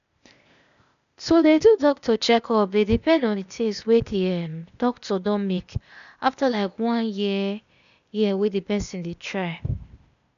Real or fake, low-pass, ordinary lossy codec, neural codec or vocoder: fake; 7.2 kHz; none; codec, 16 kHz, 0.8 kbps, ZipCodec